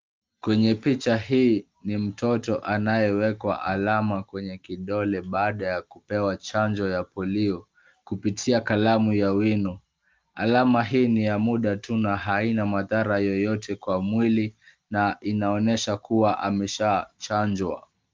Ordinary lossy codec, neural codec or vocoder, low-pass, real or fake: Opus, 32 kbps; none; 7.2 kHz; real